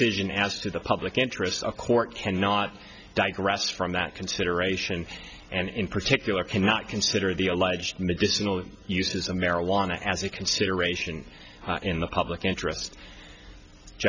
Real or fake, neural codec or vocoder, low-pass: real; none; 7.2 kHz